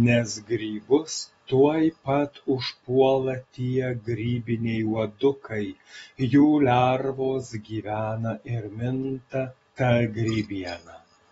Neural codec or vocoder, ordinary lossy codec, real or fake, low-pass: none; AAC, 24 kbps; real; 19.8 kHz